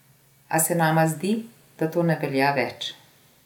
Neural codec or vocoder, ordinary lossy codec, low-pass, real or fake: none; none; 19.8 kHz; real